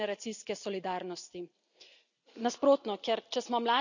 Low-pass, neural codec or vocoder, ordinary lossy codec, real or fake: 7.2 kHz; none; none; real